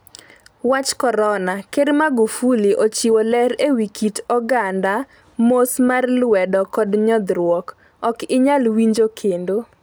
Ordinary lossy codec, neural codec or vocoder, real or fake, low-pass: none; none; real; none